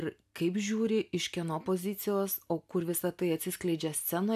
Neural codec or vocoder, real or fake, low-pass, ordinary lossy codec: none; real; 14.4 kHz; AAC, 96 kbps